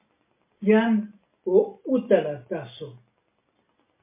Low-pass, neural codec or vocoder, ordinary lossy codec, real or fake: 3.6 kHz; none; MP3, 24 kbps; real